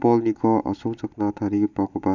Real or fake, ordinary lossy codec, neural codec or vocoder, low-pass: real; none; none; 7.2 kHz